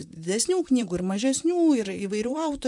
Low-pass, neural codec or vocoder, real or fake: 10.8 kHz; vocoder, 44.1 kHz, 128 mel bands, Pupu-Vocoder; fake